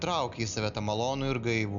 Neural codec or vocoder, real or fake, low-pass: none; real; 7.2 kHz